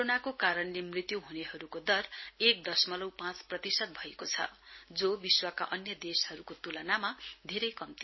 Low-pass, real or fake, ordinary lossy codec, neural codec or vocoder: 7.2 kHz; real; MP3, 24 kbps; none